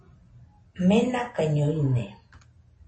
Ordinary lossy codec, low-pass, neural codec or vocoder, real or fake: MP3, 32 kbps; 9.9 kHz; none; real